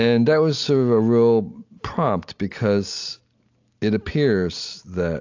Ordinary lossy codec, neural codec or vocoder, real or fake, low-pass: AAC, 48 kbps; none; real; 7.2 kHz